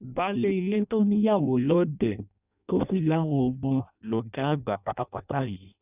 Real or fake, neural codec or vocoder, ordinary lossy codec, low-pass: fake; codec, 16 kHz in and 24 kHz out, 0.6 kbps, FireRedTTS-2 codec; none; 3.6 kHz